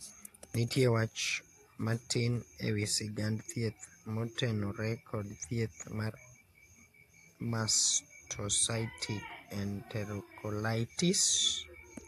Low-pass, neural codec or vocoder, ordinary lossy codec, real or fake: 14.4 kHz; none; AAC, 64 kbps; real